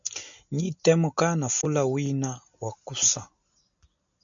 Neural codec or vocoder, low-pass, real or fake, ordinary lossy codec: none; 7.2 kHz; real; MP3, 96 kbps